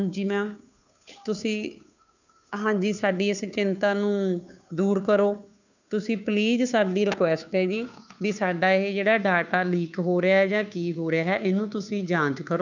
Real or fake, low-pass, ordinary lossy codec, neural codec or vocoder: fake; 7.2 kHz; none; codec, 16 kHz, 2 kbps, FunCodec, trained on Chinese and English, 25 frames a second